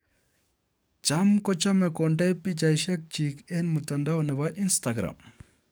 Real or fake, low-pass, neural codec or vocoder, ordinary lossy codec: fake; none; codec, 44.1 kHz, 7.8 kbps, DAC; none